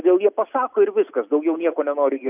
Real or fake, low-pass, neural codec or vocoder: real; 3.6 kHz; none